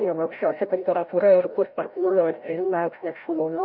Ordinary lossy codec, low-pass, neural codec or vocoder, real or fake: MP3, 48 kbps; 5.4 kHz; codec, 16 kHz, 0.5 kbps, FreqCodec, larger model; fake